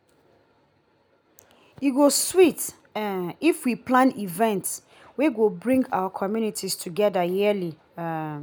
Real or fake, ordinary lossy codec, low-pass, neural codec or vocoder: real; none; none; none